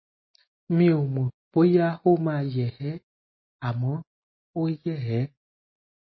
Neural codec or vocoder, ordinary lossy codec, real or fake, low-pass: none; MP3, 24 kbps; real; 7.2 kHz